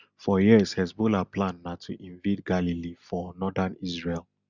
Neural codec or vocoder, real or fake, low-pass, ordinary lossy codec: none; real; 7.2 kHz; Opus, 64 kbps